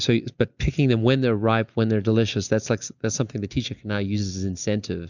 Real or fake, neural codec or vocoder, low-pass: real; none; 7.2 kHz